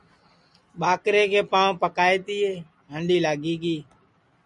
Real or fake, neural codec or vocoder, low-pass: real; none; 10.8 kHz